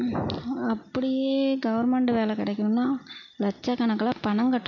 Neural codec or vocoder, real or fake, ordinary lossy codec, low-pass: none; real; none; 7.2 kHz